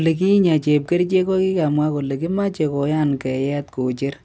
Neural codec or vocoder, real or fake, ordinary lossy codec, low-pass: none; real; none; none